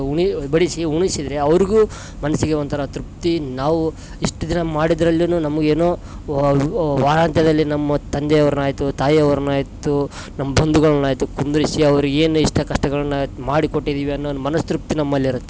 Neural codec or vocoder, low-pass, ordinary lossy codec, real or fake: none; none; none; real